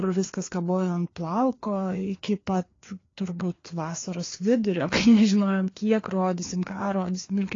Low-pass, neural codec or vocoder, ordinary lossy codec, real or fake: 7.2 kHz; codec, 16 kHz, 2 kbps, FreqCodec, larger model; AAC, 32 kbps; fake